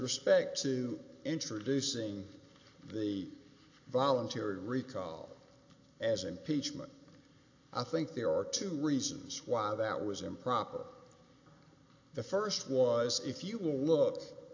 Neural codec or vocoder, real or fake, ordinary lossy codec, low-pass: none; real; AAC, 48 kbps; 7.2 kHz